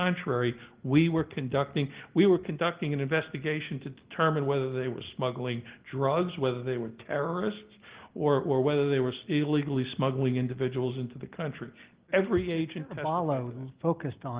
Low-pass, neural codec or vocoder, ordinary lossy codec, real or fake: 3.6 kHz; none; Opus, 16 kbps; real